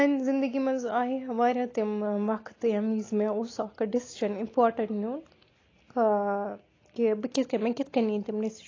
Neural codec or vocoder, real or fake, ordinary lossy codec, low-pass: none; real; AAC, 32 kbps; 7.2 kHz